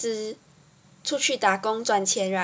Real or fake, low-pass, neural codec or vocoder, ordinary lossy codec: real; none; none; none